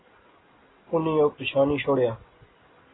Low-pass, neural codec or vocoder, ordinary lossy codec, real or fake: 7.2 kHz; none; AAC, 16 kbps; real